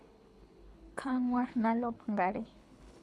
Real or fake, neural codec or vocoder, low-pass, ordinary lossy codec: fake; codec, 24 kHz, 6 kbps, HILCodec; none; none